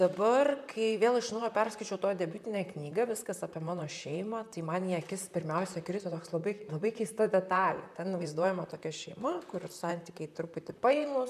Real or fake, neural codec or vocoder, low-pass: fake; vocoder, 44.1 kHz, 128 mel bands, Pupu-Vocoder; 14.4 kHz